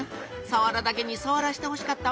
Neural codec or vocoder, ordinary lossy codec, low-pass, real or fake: none; none; none; real